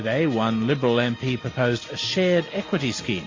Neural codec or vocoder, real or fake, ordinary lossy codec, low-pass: none; real; AAC, 32 kbps; 7.2 kHz